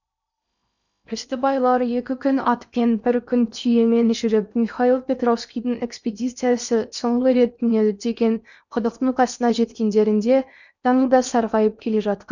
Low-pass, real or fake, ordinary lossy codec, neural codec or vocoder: 7.2 kHz; fake; none; codec, 16 kHz in and 24 kHz out, 0.8 kbps, FocalCodec, streaming, 65536 codes